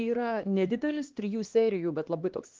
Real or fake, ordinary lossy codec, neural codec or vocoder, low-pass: fake; Opus, 16 kbps; codec, 16 kHz, 2 kbps, X-Codec, HuBERT features, trained on LibriSpeech; 7.2 kHz